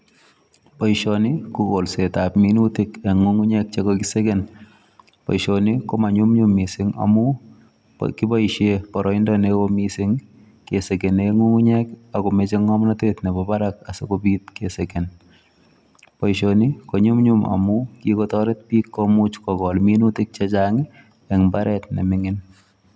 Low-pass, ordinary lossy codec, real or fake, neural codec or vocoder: none; none; real; none